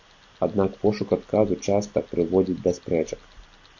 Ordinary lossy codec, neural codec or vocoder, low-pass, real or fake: AAC, 48 kbps; none; 7.2 kHz; real